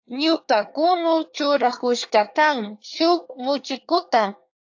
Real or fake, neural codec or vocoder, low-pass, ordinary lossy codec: fake; codec, 24 kHz, 1 kbps, SNAC; 7.2 kHz; AAC, 48 kbps